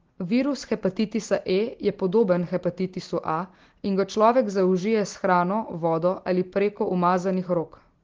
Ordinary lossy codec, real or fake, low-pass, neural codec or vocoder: Opus, 16 kbps; real; 7.2 kHz; none